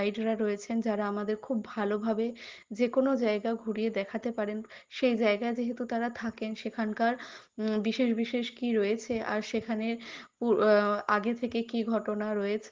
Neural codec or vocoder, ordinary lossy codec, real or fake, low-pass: none; Opus, 16 kbps; real; 7.2 kHz